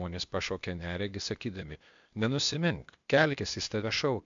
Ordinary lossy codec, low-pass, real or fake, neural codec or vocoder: MP3, 64 kbps; 7.2 kHz; fake; codec, 16 kHz, 0.8 kbps, ZipCodec